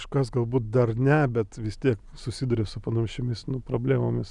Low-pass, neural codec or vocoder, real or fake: 10.8 kHz; none; real